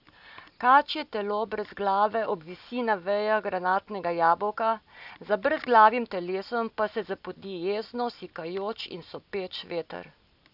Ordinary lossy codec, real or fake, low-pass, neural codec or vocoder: none; real; 5.4 kHz; none